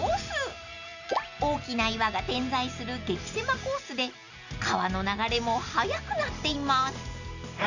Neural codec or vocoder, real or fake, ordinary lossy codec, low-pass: none; real; none; 7.2 kHz